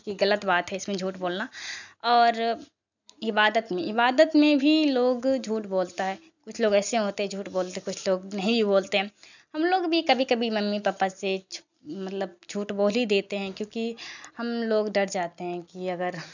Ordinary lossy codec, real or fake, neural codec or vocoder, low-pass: none; real; none; 7.2 kHz